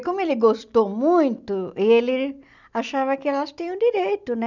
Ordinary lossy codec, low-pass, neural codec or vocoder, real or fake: none; 7.2 kHz; none; real